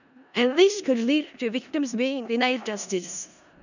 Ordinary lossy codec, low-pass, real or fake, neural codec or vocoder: none; 7.2 kHz; fake; codec, 16 kHz in and 24 kHz out, 0.4 kbps, LongCat-Audio-Codec, four codebook decoder